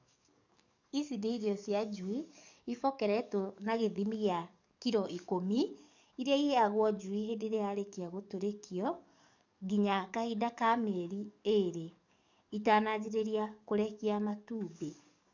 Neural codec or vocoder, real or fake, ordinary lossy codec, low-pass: codec, 44.1 kHz, 7.8 kbps, DAC; fake; none; 7.2 kHz